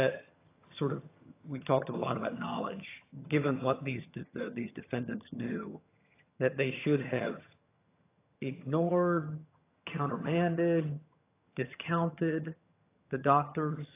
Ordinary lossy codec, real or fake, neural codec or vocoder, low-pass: AAC, 24 kbps; fake; vocoder, 22.05 kHz, 80 mel bands, HiFi-GAN; 3.6 kHz